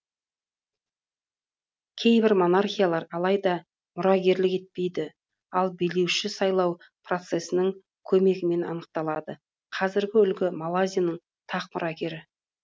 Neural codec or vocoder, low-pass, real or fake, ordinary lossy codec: none; none; real; none